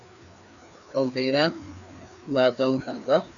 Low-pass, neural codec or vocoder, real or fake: 7.2 kHz; codec, 16 kHz, 2 kbps, FreqCodec, larger model; fake